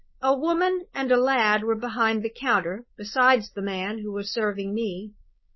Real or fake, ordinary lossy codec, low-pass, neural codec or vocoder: fake; MP3, 24 kbps; 7.2 kHz; codec, 16 kHz, 4.8 kbps, FACodec